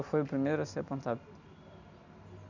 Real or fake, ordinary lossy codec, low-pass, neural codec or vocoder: real; none; 7.2 kHz; none